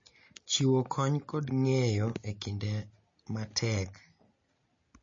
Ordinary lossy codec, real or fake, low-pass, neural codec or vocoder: MP3, 32 kbps; real; 7.2 kHz; none